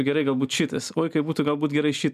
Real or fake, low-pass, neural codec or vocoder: real; 14.4 kHz; none